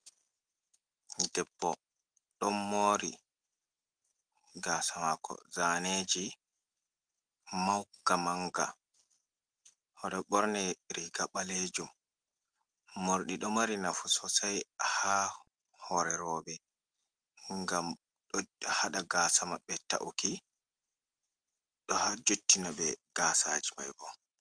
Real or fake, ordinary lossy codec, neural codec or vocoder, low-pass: real; Opus, 24 kbps; none; 9.9 kHz